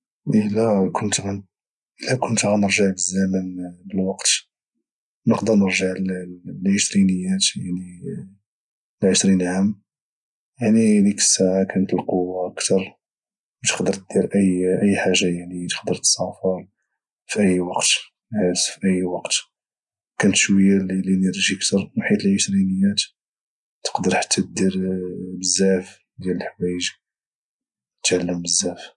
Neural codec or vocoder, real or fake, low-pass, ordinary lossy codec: none; real; 10.8 kHz; none